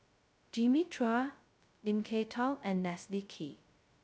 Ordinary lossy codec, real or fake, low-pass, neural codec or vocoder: none; fake; none; codec, 16 kHz, 0.2 kbps, FocalCodec